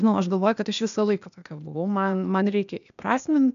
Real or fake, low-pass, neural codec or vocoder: fake; 7.2 kHz; codec, 16 kHz, 0.8 kbps, ZipCodec